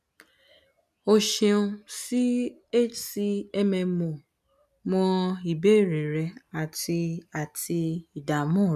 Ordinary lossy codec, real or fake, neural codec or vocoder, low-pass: none; real; none; 14.4 kHz